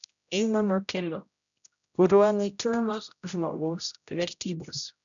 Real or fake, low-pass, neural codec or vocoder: fake; 7.2 kHz; codec, 16 kHz, 0.5 kbps, X-Codec, HuBERT features, trained on general audio